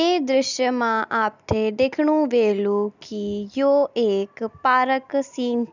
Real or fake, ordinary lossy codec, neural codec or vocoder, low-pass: real; none; none; 7.2 kHz